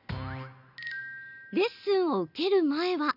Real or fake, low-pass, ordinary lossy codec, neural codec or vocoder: real; 5.4 kHz; none; none